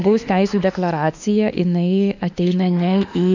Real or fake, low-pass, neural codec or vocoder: fake; 7.2 kHz; autoencoder, 48 kHz, 32 numbers a frame, DAC-VAE, trained on Japanese speech